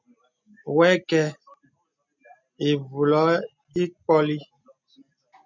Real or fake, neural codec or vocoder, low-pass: real; none; 7.2 kHz